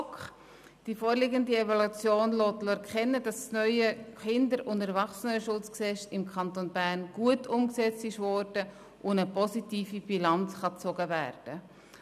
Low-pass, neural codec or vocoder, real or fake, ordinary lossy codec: 14.4 kHz; none; real; none